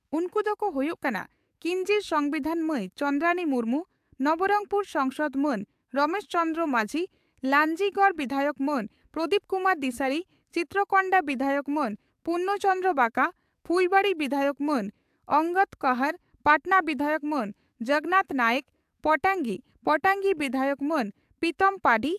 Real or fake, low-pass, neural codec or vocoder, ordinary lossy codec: fake; 14.4 kHz; codec, 44.1 kHz, 7.8 kbps, DAC; AAC, 96 kbps